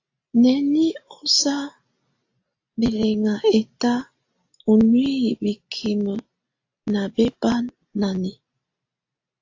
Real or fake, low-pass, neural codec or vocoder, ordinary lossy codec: real; 7.2 kHz; none; AAC, 48 kbps